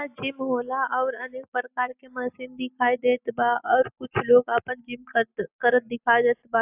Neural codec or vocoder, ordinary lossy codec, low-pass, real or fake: none; AAC, 32 kbps; 3.6 kHz; real